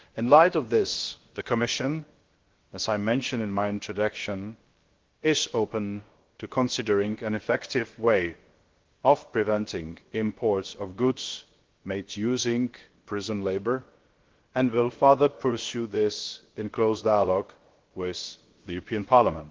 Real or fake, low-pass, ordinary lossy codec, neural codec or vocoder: fake; 7.2 kHz; Opus, 16 kbps; codec, 16 kHz, about 1 kbps, DyCAST, with the encoder's durations